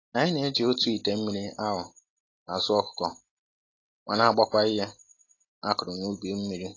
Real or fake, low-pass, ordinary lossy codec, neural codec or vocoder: real; 7.2 kHz; AAC, 32 kbps; none